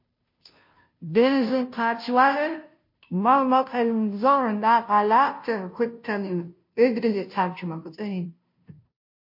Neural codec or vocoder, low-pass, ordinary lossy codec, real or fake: codec, 16 kHz, 0.5 kbps, FunCodec, trained on Chinese and English, 25 frames a second; 5.4 kHz; MP3, 32 kbps; fake